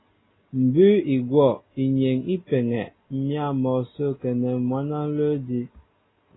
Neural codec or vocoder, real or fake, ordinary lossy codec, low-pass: none; real; AAC, 16 kbps; 7.2 kHz